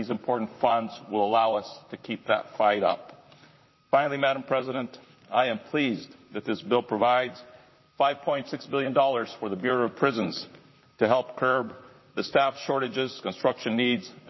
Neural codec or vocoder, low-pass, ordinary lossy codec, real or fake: vocoder, 44.1 kHz, 128 mel bands, Pupu-Vocoder; 7.2 kHz; MP3, 24 kbps; fake